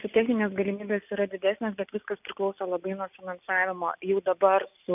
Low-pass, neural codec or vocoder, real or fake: 3.6 kHz; none; real